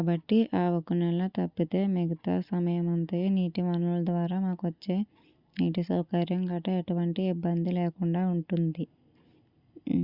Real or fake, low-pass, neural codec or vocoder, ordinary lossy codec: real; 5.4 kHz; none; Opus, 64 kbps